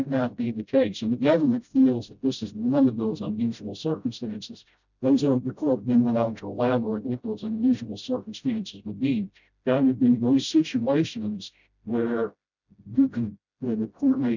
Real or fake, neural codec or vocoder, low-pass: fake; codec, 16 kHz, 0.5 kbps, FreqCodec, smaller model; 7.2 kHz